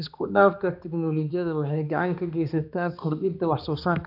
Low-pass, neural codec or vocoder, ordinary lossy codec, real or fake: 5.4 kHz; codec, 16 kHz, 2 kbps, X-Codec, HuBERT features, trained on balanced general audio; none; fake